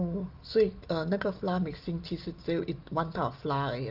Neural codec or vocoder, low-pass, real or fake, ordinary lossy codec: none; 5.4 kHz; real; Opus, 32 kbps